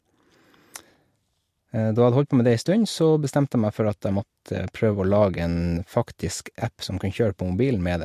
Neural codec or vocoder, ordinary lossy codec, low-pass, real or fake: none; MP3, 64 kbps; 14.4 kHz; real